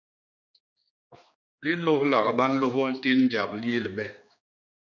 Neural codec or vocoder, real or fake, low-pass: codec, 16 kHz, 2 kbps, X-Codec, HuBERT features, trained on general audio; fake; 7.2 kHz